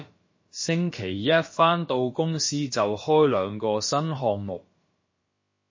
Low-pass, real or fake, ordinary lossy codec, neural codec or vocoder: 7.2 kHz; fake; MP3, 32 kbps; codec, 16 kHz, about 1 kbps, DyCAST, with the encoder's durations